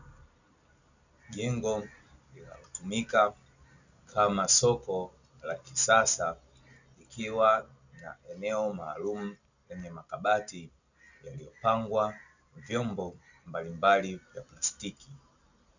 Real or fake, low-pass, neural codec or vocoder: real; 7.2 kHz; none